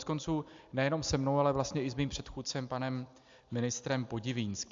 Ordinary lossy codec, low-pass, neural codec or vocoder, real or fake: AAC, 64 kbps; 7.2 kHz; none; real